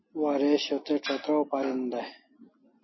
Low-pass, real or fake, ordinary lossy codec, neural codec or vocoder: 7.2 kHz; real; MP3, 24 kbps; none